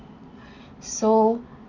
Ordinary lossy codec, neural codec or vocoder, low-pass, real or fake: none; none; 7.2 kHz; real